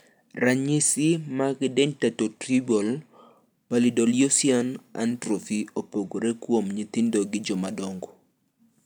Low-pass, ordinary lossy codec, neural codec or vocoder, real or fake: none; none; none; real